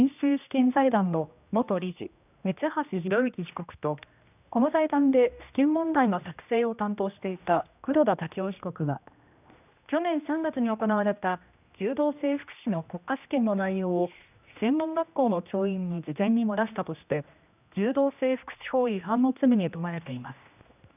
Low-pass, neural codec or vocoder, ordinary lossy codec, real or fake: 3.6 kHz; codec, 16 kHz, 1 kbps, X-Codec, HuBERT features, trained on general audio; none; fake